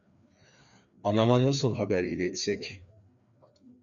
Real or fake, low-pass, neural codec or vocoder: fake; 7.2 kHz; codec, 16 kHz, 2 kbps, FreqCodec, larger model